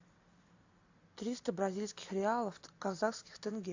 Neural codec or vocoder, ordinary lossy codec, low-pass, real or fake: none; AAC, 48 kbps; 7.2 kHz; real